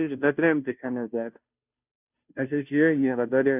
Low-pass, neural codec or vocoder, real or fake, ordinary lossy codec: 3.6 kHz; codec, 16 kHz, 0.5 kbps, FunCodec, trained on Chinese and English, 25 frames a second; fake; none